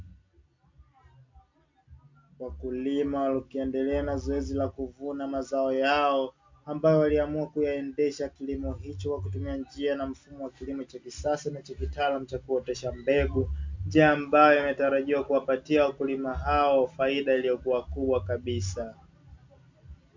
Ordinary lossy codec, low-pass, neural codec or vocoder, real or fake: MP3, 64 kbps; 7.2 kHz; none; real